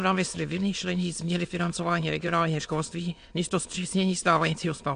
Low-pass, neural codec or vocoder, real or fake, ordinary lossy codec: 9.9 kHz; autoencoder, 22.05 kHz, a latent of 192 numbers a frame, VITS, trained on many speakers; fake; AAC, 64 kbps